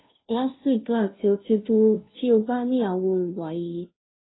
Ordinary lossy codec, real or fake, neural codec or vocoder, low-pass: AAC, 16 kbps; fake; codec, 16 kHz, 0.5 kbps, FunCodec, trained on Chinese and English, 25 frames a second; 7.2 kHz